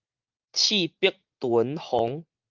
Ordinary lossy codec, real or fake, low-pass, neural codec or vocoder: Opus, 24 kbps; fake; 7.2 kHz; vocoder, 44.1 kHz, 80 mel bands, Vocos